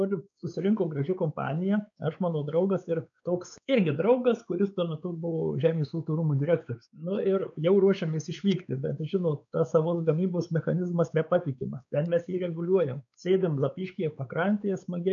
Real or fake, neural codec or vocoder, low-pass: fake; codec, 16 kHz, 4 kbps, X-Codec, WavLM features, trained on Multilingual LibriSpeech; 7.2 kHz